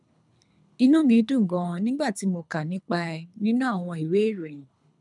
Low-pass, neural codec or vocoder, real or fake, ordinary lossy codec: 10.8 kHz; codec, 24 kHz, 3 kbps, HILCodec; fake; none